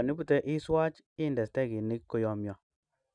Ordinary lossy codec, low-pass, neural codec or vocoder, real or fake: none; 9.9 kHz; none; real